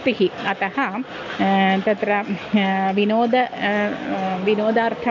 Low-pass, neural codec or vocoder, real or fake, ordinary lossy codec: 7.2 kHz; none; real; none